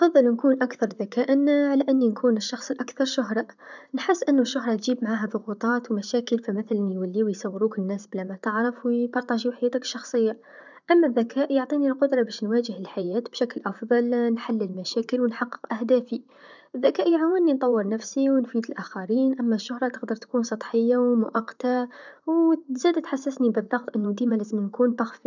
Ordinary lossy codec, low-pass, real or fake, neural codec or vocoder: none; 7.2 kHz; fake; vocoder, 44.1 kHz, 128 mel bands, Pupu-Vocoder